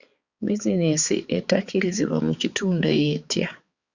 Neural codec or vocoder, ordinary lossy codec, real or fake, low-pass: codec, 16 kHz, 4 kbps, X-Codec, HuBERT features, trained on balanced general audio; Opus, 64 kbps; fake; 7.2 kHz